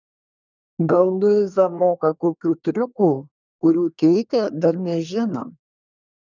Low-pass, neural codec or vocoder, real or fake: 7.2 kHz; codec, 24 kHz, 1 kbps, SNAC; fake